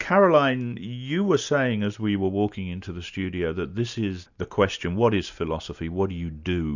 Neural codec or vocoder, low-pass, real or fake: none; 7.2 kHz; real